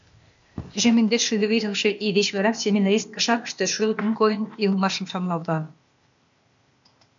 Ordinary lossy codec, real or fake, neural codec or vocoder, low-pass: MP3, 64 kbps; fake; codec, 16 kHz, 0.8 kbps, ZipCodec; 7.2 kHz